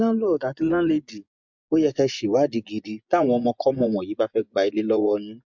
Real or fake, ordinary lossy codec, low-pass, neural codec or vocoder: fake; MP3, 64 kbps; 7.2 kHz; vocoder, 24 kHz, 100 mel bands, Vocos